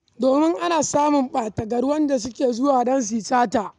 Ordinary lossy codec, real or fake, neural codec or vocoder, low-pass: none; real; none; 10.8 kHz